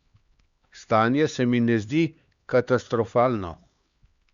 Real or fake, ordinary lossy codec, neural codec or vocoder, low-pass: fake; Opus, 64 kbps; codec, 16 kHz, 2 kbps, X-Codec, HuBERT features, trained on LibriSpeech; 7.2 kHz